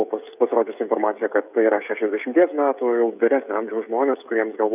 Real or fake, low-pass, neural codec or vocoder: fake; 3.6 kHz; codec, 16 kHz, 16 kbps, FreqCodec, smaller model